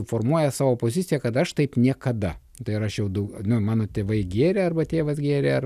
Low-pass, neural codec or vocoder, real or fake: 14.4 kHz; none; real